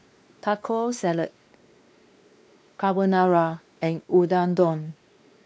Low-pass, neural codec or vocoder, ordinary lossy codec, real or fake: none; codec, 16 kHz, 2 kbps, X-Codec, WavLM features, trained on Multilingual LibriSpeech; none; fake